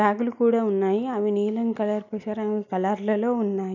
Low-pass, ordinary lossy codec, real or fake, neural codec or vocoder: 7.2 kHz; none; real; none